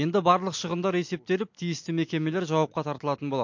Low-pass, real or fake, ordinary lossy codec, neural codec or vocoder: 7.2 kHz; real; MP3, 48 kbps; none